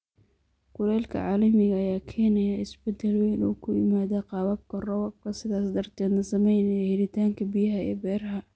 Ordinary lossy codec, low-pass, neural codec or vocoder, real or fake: none; none; none; real